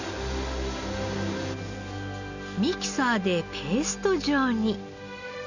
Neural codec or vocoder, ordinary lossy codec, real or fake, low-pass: none; none; real; 7.2 kHz